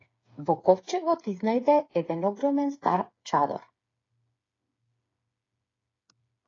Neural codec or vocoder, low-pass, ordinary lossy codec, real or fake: codec, 16 kHz, 8 kbps, FreqCodec, smaller model; 7.2 kHz; AAC, 32 kbps; fake